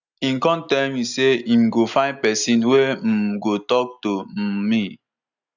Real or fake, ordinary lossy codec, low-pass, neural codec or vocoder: real; none; 7.2 kHz; none